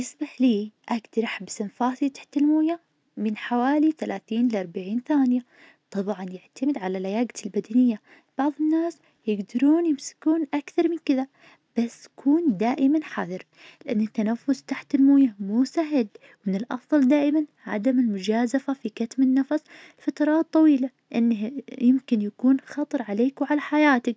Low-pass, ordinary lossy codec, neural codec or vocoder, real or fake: none; none; none; real